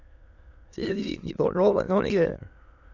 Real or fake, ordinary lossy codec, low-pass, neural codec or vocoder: fake; AAC, 48 kbps; 7.2 kHz; autoencoder, 22.05 kHz, a latent of 192 numbers a frame, VITS, trained on many speakers